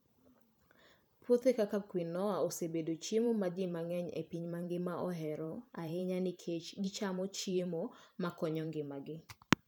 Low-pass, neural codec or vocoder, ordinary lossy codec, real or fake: none; vocoder, 44.1 kHz, 128 mel bands every 512 samples, BigVGAN v2; none; fake